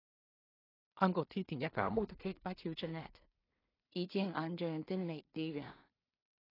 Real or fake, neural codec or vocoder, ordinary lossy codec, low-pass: fake; codec, 16 kHz in and 24 kHz out, 0.4 kbps, LongCat-Audio-Codec, two codebook decoder; AAC, 24 kbps; 5.4 kHz